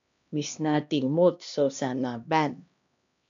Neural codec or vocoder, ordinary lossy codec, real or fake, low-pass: codec, 16 kHz, 1 kbps, X-Codec, HuBERT features, trained on LibriSpeech; AAC, 64 kbps; fake; 7.2 kHz